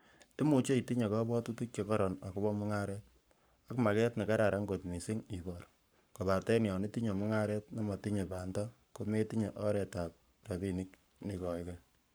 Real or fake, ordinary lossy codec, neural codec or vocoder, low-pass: fake; none; codec, 44.1 kHz, 7.8 kbps, Pupu-Codec; none